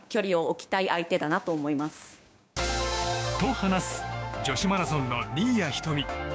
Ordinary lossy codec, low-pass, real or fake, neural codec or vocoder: none; none; fake; codec, 16 kHz, 6 kbps, DAC